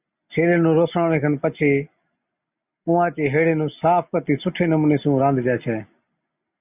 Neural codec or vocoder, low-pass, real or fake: none; 3.6 kHz; real